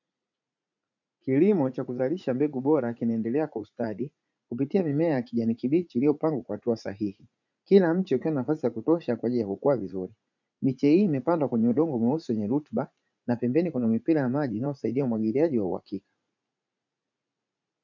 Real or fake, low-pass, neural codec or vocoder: fake; 7.2 kHz; vocoder, 44.1 kHz, 80 mel bands, Vocos